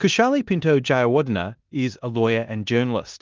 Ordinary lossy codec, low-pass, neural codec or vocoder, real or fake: Opus, 24 kbps; 7.2 kHz; codec, 16 kHz in and 24 kHz out, 0.9 kbps, LongCat-Audio-Codec, four codebook decoder; fake